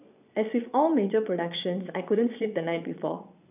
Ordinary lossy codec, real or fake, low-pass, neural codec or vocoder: none; fake; 3.6 kHz; vocoder, 22.05 kHz, 80 mel bands, Vocos